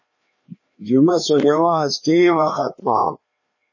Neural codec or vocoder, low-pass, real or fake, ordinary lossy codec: codec, 16 kHz, 2 kbps, FreqCodec, larger model; 7.2 kHz; fake; MP3, 32 kbps